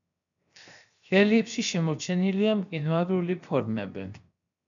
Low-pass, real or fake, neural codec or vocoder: 7.2 kHz; fake; codec, 16 kHz, 0.7 kbps, FocalCodec